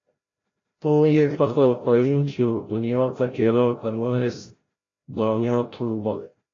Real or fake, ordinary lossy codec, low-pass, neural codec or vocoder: fake; AAC, 32 kbps; 7.2 kHz; codec, 16 kHz, 0.5 kbps, FreqCodec, larger model